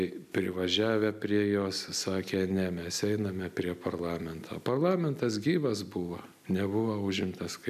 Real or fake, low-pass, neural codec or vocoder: real; 14.4 kHz; none